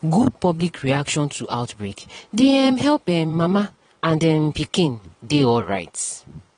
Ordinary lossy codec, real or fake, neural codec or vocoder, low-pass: AAC, 32 kbps; fake; vocoder, 22.05 kHz, 80 mel bands, Vocos; 9.9 kHz